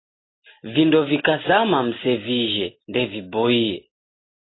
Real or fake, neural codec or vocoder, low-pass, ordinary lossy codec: real; none; 7.2 kHz; AAC, 16 kbps